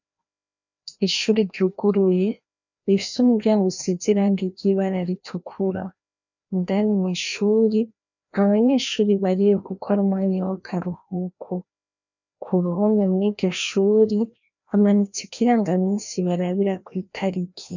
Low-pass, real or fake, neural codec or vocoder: 7.2 kHz; fake; codec, 16 kHz, 1 kbps, FreqCodec, larger model